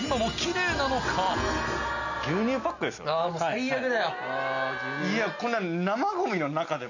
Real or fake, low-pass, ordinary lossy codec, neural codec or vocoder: real; 7.2 kHz; none; none